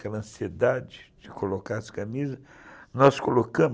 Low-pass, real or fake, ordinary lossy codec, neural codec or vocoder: none; real; none; none